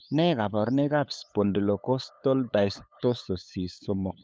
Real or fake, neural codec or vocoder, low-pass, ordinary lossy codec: fake; codec, 16 kHz, 8 kbps, FunCodec, trained on LibriTTS, 25 frames a second; none; none